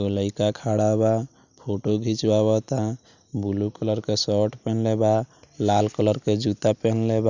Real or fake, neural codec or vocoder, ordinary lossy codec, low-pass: real; none; none; 7.2 kHz